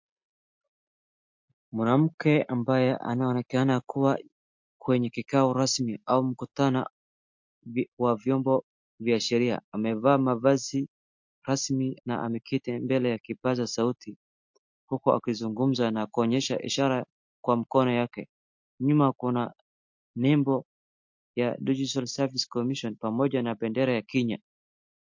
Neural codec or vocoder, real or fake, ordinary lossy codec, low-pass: none; real; MP3, 48 kbps; 7.2 kHz